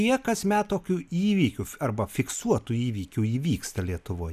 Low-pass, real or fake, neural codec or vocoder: 14.4 kHz; real; none